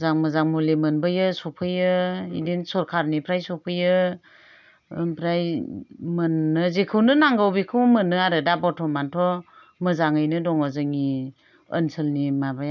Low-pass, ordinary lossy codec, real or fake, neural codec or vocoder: 7.2 kHz; none; real; none